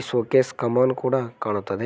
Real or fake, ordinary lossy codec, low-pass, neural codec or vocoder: real; none; none; none